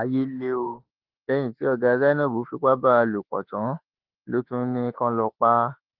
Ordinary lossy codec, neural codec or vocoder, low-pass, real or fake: Opus, 16 kbps; autoencoder, 48 kHz, 32 numbers a frame, DAC-VAE, trained on Japanese speech; 5.4 kHz; fake